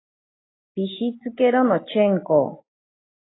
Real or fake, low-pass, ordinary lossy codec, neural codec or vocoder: real; 7.2 kHz; AAC, 16 kbps; none